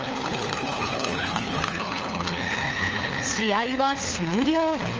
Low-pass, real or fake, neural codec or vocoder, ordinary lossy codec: 7.2 kHz; fake; codec, 16 kHz, 4 kbps, FunCodec, trained on LibriTTS, 50 frames a second; Opus, 24 kbps